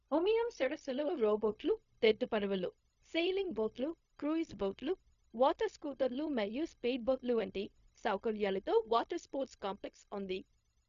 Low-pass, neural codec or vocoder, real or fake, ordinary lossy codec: 7.2 kHz; codec, 16 kHz, 0.4 kbps, LongCat-Audio-Codec; fake; MP3, 64 kbps